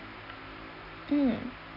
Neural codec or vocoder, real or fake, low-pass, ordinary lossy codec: none; real; 5.4 kHz; none